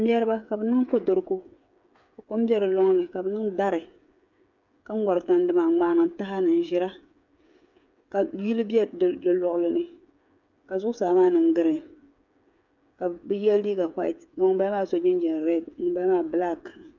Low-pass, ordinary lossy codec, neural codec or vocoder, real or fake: 7.2 kHz; MP3, 64 kbps; codec, 16 kHz, 8 kbps, FreqCodec, smaller model; fake